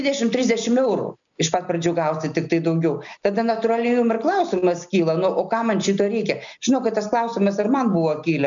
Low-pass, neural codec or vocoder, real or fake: 7.2 kHz; none; real